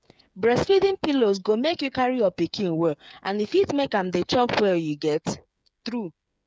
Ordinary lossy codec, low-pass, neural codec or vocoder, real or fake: none; none; codec, 16 kHz, 8 kbps, FreqCodec, smaller model; fake